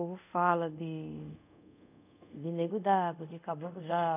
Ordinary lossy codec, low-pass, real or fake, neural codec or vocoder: none; 3.6 kHz; fake; codec, 24 kHz, 0.5 kbps, DualCodec